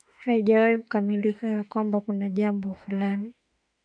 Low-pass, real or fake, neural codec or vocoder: 9.9 kHz; fake; autoencoder, 48 kHz, 32 numbers a frame, DAC-VAE, trained on Japanese speech